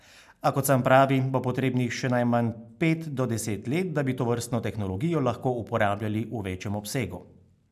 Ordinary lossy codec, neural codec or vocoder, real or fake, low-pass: MP3, 96 kbps; none; real; 14.4 kHz